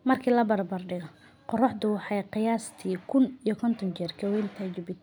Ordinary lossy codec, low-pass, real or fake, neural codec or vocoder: none; 19.8 kHz; real; none